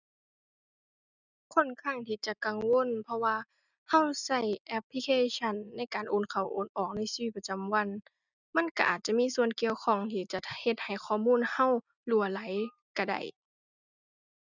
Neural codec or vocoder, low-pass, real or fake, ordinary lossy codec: none; 7.2 kHz; real; none